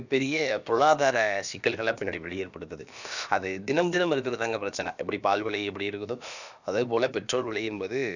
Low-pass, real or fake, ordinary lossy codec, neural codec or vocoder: 7.2 kHz; fake; none; codec, 16 kHz, about 1 kbps, DyCAST, with the encoder's durations